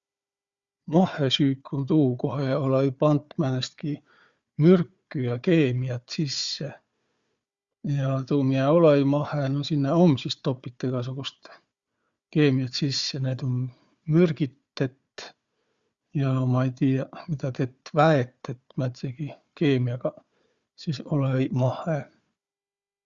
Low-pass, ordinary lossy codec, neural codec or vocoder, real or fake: 7.2 kHz; Opus, 64 kbps; codec, 16 kHz, 4 kbps, FunCodec, trained on Chinese and English, 50 frames a second; fake